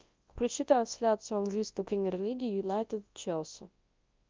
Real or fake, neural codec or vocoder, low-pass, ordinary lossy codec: fake; codec, 24 kHz, 0.9 kbps, WavTokenizer, large speech release; 7.2 kHz; Opus, 32 kbps